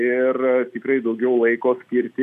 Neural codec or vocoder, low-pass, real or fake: none; 14.4 kHz; real